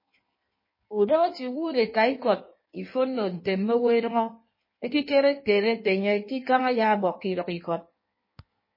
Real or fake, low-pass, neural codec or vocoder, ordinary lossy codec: fake; 5.4 kHz; codec, 16 kHz in and 24 kHz out, 1.1 kbps, FireRedTTS-2 codec; MP3, 24 kbps